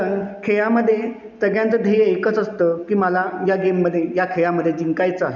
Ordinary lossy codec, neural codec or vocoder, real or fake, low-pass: none; none; real; 7.2 kHz